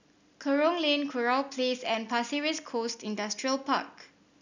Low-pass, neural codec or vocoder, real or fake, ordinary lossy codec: 7.2 kHz; none; real; none